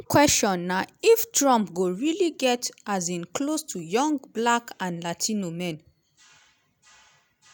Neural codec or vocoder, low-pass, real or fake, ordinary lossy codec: none; none; real; none